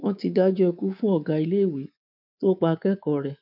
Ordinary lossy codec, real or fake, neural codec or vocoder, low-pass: none; fake; codec, 24 kHz, 3.1 kbps, DualCodec; 5.4 kHz